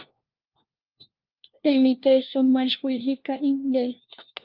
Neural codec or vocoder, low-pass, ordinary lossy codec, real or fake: codec, 16 kHz, 1 kbps, FunCodec, trained on LibriTTS, 50 frames a second; 5.4 kHz; Opus, 24 kbps; fake